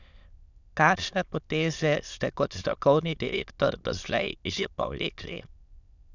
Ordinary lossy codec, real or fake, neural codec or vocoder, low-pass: none; fake; autoencoder, 22.05 kHz, a latent of 192 numbers a frame, VITS, trained on many speakers; 7.2 kHz